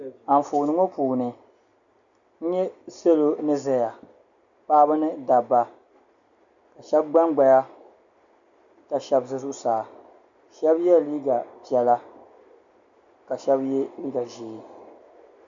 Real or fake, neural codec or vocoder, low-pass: real; none; 7.2 kHz